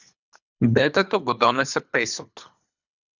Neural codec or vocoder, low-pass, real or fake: codec, 24 kHz, 3 kbps, HILCodec; 7.2 kHz; fake